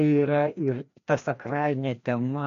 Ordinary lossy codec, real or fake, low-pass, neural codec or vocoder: MP3, 64 kbps; fake; 7.2 kHz; codec, 16 kHz, 1 kbps, FreqCodec, larger model